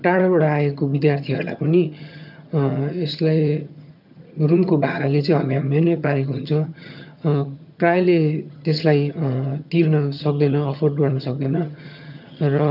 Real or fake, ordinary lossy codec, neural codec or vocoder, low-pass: fake; none; vocoder, 22.05 kHz, 80 mel bands, HiFi-GAN; 5.4 kHz